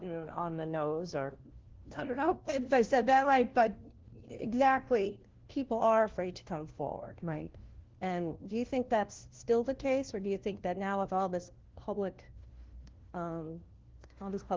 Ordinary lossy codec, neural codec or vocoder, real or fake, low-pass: Opus, 16 kbps; codec, 16 kHz, 1 kbps, FunCodec, trained on LibriTTS, 50 frames a second; fake; 7.2 kHz